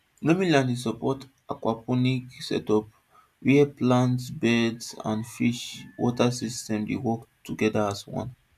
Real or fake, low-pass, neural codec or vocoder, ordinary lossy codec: real; 14.4 kHz; none; none